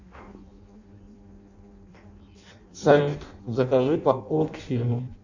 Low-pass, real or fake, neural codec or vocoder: 7.2 kHz; fake; codec, 16 kHz in and 24 kHz out, 0.6 kbps, FireRedTTS-2 codec